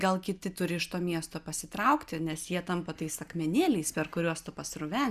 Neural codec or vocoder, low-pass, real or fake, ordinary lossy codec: none; 14.4 kHz; real; Opus, 64 kbps